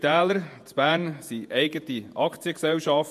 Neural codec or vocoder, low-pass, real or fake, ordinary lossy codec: vocoder, 48 kHz, 128 mel bands, Vocos; 14.4 kHz; fake; MP3, 64 kbps